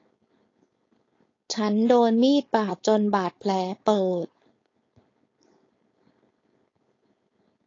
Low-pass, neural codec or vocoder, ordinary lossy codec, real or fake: 7.2 kHz; codec, 16 kHz, 4.8 kbps, FACodec; AAC, 32 kbps; fake